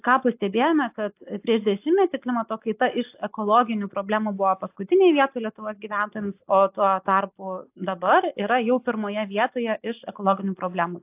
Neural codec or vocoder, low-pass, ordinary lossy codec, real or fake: none; 3.6 kHz; AAC, 32 kbps; real